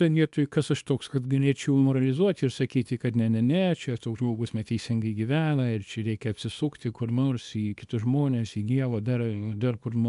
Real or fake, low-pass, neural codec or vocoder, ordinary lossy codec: fake; 10.8 kHz; codec, 24 kHz, 0.9 kbps, WavTokenizer, small release; MP3, 96 kbps